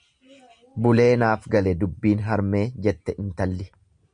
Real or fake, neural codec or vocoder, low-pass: real; none; 9.9 kHz